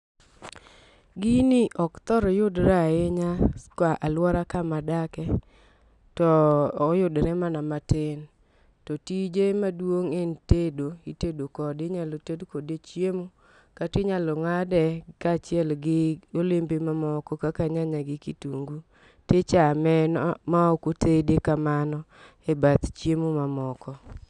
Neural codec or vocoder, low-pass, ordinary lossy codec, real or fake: none; 10.8 kHz; none; real